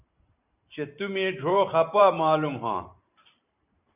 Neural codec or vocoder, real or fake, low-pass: vocoder, 44.1 kHz, 128 mel bands every 512 samples, BigVGAN v2; fake; 3.6 kHz